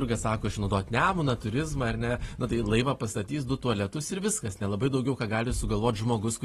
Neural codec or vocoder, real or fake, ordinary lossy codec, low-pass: none; real; AAC, 32 kbps; 19.8 kHz